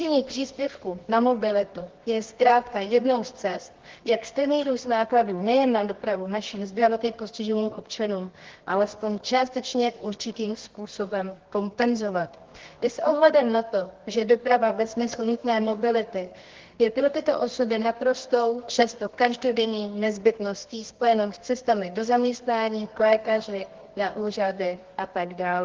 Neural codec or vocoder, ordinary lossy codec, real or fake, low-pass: codec, 24 kHz, 0.9 kbps, WavTokenizer, medium music audio release; Opus, 16 kbps; fake; 7.2 kHz